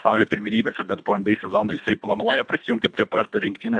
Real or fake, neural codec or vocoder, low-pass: fake; codec, 24 kHz, 1.5 kbps, HILCodec; 9.9 kHz